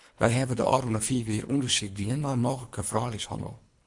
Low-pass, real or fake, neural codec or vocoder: 10.8 kHz; fake; codec, 24 kHz, 3 kbps, HILCodec